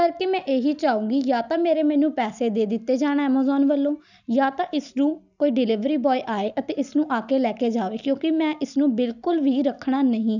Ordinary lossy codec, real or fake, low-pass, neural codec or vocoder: none; real; 7.2 kHz; none